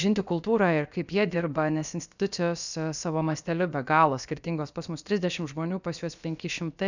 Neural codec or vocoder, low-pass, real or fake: codec, 16 kHz, about 1 kbps, DyCAST, with the encoder's durations; 7.2 kHz; fake